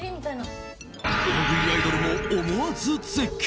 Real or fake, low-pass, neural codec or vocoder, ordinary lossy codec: real; none; none; none